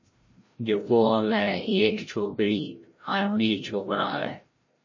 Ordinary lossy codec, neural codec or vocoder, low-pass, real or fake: MP3, 32 kbps; codec, 16 kHz, 0.5 kbps, FreqCodec, larger model; 7.2 kHz; fake